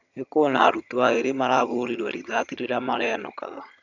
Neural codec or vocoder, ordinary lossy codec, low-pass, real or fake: vocoder, 22.05 kHz, 80 mel bands, HiFi-GAN; none; 7.2 kHz; fake